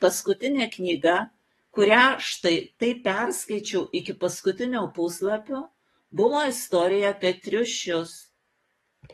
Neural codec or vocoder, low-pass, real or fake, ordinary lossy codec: codec, 44.1 kHz, 7.8 kbps, DAC; 19.8 kHz; fake; AAC, 32 kbps